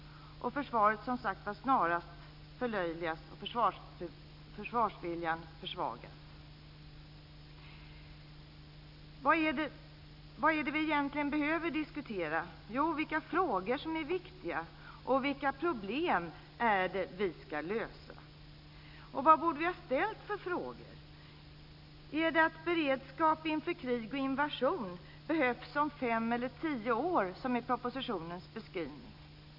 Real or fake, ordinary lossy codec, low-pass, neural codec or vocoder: real; none; 5.4 kHz; none